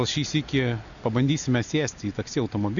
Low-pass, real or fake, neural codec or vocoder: 7.2 kHz; real; none